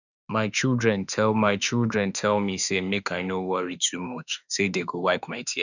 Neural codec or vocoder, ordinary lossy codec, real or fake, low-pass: autoencoder, 48 kHz, 32 numbers a frame, DAC-VAE, trained on Japanese speech; none; fake; 7.2 kHz